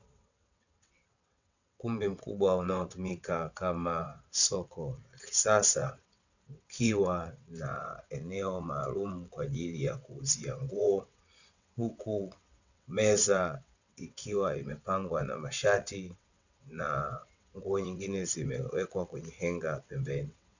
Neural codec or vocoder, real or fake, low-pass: vocoder, 22.05 kHz, 80 mel bands, WaveNeXt; fake; 7.2 kHz